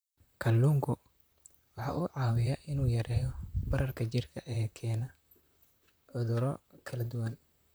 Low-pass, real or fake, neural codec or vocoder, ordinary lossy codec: none; fake; vocoder, 44.1 kHz, 128 mel bands, Pupu-Vocoder; none